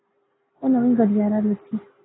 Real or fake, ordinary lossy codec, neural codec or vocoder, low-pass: real; AAC, 16 kbps; none; 7.2 kHz